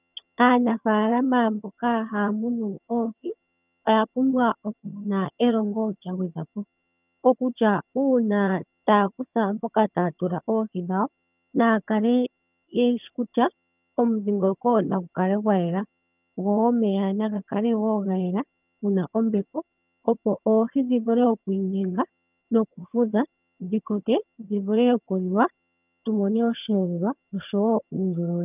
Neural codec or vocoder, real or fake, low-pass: vocoder, 22.05 kHz, 80 mel bands, HiFi-GAN; fake; 3.6 kHz